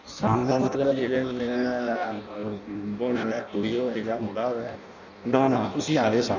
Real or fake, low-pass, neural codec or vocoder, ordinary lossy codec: fake; 7.2 kHz; codec, 16 kHz in and 24 kHz out, 0.6 kbps, FireRedTTS-2 codec; none